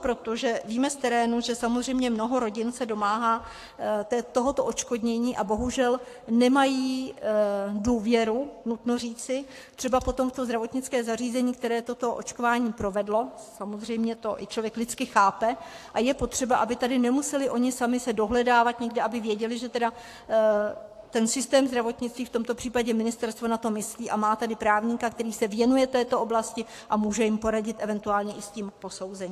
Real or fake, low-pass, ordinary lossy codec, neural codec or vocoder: fake; 14.4 kHz; AAC, 64 kbps; codec, 44.1 kHz, 7.8 kbps, Pupu-Codec